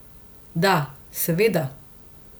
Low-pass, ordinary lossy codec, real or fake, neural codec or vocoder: none; none; real; none